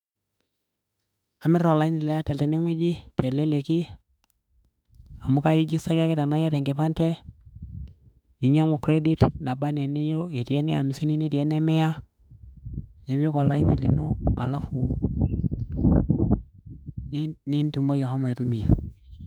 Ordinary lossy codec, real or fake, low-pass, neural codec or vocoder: none; fake; 19.8 kHz; autoencoder, 48 kHz, 32 numbers a frame, DAC-VAE, trained on Japanese speech